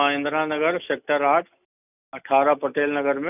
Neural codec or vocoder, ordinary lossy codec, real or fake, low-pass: none; none; real; 3.6 kHz